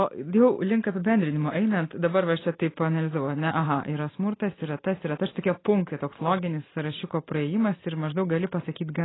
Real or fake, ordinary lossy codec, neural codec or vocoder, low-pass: real; AAC, 16 kbps; none; 7.2 kHz